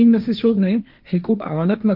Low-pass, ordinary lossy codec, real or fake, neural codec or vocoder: 5.4 kHz; none; fake; codec, 16 kHz, 1.1 kbps, Voila-Tokenizer